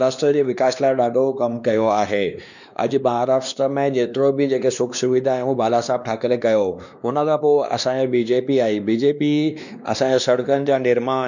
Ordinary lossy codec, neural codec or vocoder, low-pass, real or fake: none; codec, 16 kHz, 2 kbps, X-Codec, WavLM features, trained on Multilingual LibriSpeech; 7.2 kHz; fake